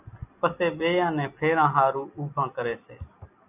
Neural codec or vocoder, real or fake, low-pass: none; real; 3.6 kHz